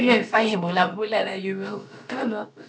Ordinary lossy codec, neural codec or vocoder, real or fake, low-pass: none; codec, 16 kHz, about 1 kbps, DyCAST, with the encoder's durations; fake; none